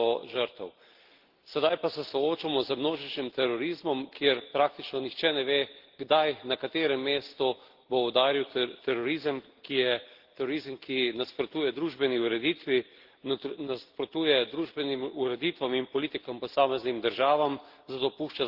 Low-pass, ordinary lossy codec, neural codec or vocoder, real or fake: 5.4 kHz; Opus, 16 kbps; none; real